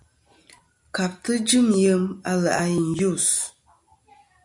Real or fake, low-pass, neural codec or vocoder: real; 10.8 kHz; none